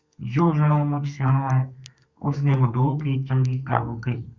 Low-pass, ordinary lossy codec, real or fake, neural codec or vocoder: 7.2 kHz; Opus, 64 kbps; fake; codec, 32 kHz, 1.9 kbps, SNAC